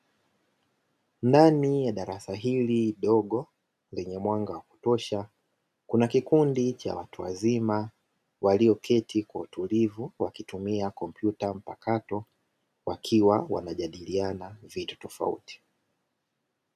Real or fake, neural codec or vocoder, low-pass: real; none; 14.4 kHz